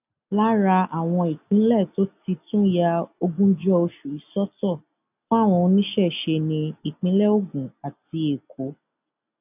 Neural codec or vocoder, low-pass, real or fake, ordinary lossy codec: none; 3.6 kHz; real; none